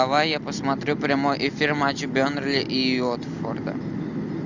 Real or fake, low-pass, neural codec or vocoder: real; 7.2 kHz; none